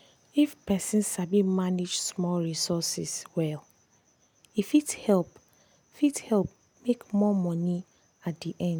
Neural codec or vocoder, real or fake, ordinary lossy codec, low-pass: none; real; none; none